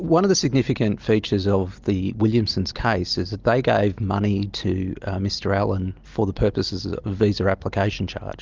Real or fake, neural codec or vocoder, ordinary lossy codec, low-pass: real; none; Opus, 32 kbps; 7.2 kHz